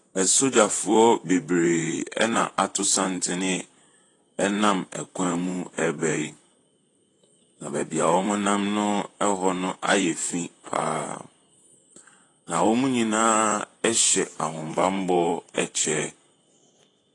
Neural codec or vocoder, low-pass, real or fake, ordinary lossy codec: vocoder, 44.1 kHz, 128 mel bands, Pupu-Vocoder; 10.8 kHz; fake; AAC, 32 kbps